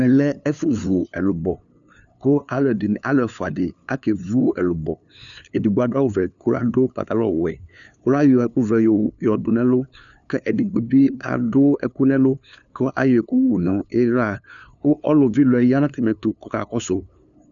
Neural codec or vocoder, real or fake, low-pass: codec, 16 kHz, 2 kbps, FunCodec, trained on LibriTTS, 25 frames a second; fake; 7.2 kHz